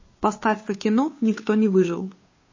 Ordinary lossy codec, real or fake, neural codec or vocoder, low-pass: MP3, 32 kbps; fake; codec, 16 kHz, 2 kbps, FunCodec, trained on Chinese and English, 25 frames a second; 7.2 kHz